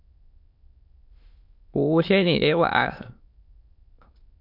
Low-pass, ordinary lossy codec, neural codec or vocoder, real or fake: 5.4 kHz; MP3, 48 kbps; autoencoder, 22.05 kHz, a latent of 192 numbers a frame, VITS, trained on many speakers; fake